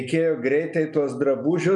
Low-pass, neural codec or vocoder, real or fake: 10.8 kHz; none; real